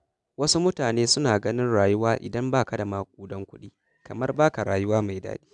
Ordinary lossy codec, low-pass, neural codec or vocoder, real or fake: none; 10.8 kHz; none; real